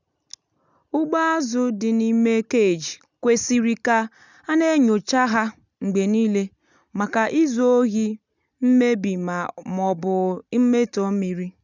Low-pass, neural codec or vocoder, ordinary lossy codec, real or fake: 7.2 kHz; none; none; real